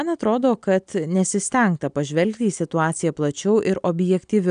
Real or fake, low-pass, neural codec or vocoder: real; 9.9 kHz; none